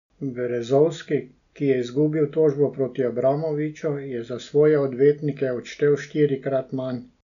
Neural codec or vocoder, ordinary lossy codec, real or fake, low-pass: none; none; real; 7.2 kHz